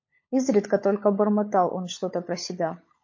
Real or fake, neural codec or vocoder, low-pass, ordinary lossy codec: fake; codec, 16 kHz, 16 kbps, FunCodec, trained on LibriTTS, 50 frames a second; 7.2 kHz; MP3, 32 kbps